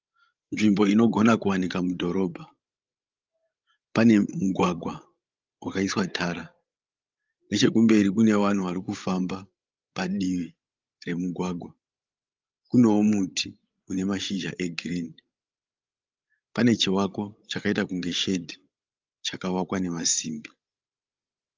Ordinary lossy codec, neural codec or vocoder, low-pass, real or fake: Opus, 32 kbps; codec, 16 kHz, 16 kbps, FreqCodec, larger model; 7.2 kHz; fake